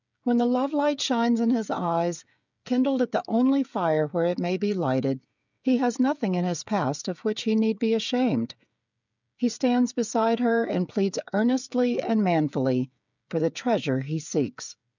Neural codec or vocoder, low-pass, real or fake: codec, 16 kHz, 16 kbps, FreqCodec, smaller model; 7.2 kHz; fake